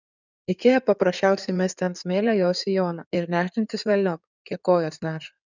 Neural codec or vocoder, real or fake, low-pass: codec, 16 kHz in and 24 kHz out, 2.2 kbps, FireRedTTS-2 codec; fake; 7.2 kHz